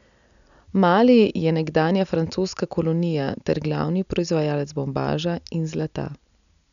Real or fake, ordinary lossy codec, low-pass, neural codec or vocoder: real; none; 7.2 kHz; none